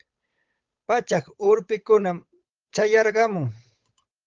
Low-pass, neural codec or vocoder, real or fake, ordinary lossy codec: 7.2 kHz; codec, 16 kHz, 8 kbps, FunCodec, trained on Chinese and English, 25 frames a second; fake; Opus, 32 kbps